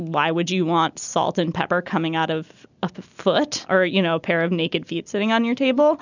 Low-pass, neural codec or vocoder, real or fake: 7.2 kHz; none; real